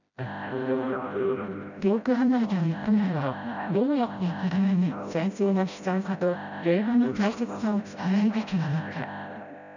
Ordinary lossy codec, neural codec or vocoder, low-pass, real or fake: none; codec, 16 kHz, 0.5 kbps, FreqCodec, smaller model; 7.2 kHz; fake